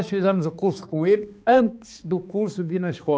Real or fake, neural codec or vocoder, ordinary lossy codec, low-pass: fake; codec, 16 kHz, 2 kbps, X-Codec, HuBERT features, trained on balanced general audio; none; none